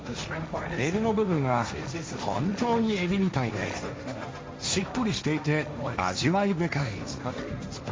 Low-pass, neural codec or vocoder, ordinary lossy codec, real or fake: none; codec, 16 kHz, 1.1 kbps, Voila-Tokenizer; none; fake